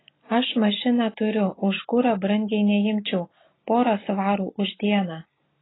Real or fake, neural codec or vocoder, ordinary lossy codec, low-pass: real; none; AAC, 16 kbps; 7.2 kHz